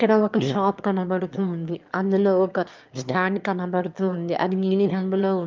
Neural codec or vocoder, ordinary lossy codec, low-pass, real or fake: autoencoder, 22.05 kHz, a latent of 192 numbers a frame, VITS, trained on one speaker; Opus, 24 kbps; 7.2 kHz; fake